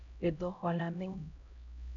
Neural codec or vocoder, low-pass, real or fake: codec, 16 kHz, 0.5 kbps, X-Codec, HuBERT features, trained on LibriSpeech; 7.2 kHz; fake